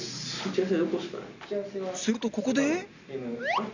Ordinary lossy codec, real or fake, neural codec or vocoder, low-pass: none; real; none; 7.2 kHz